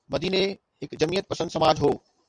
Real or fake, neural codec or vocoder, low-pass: fake; vocoder, 44.1 kHz, 128 mel bands every 256 samples, BigVGAN v2; 9.9 kHz